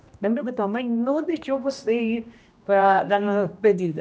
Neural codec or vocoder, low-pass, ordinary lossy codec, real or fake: codec, 16 kHz, 1 kbps, X-Codec, HuBERT features, trained on general audio; none; none; fake